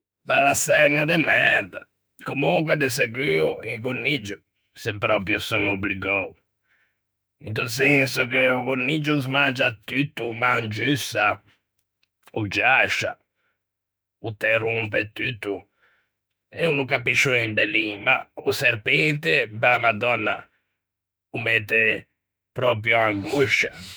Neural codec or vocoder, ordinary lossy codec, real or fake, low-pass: autoencoder, 48 kHz, 32 numbers a frame, DAC-VAE, trained on Japanese speech; none; fake; none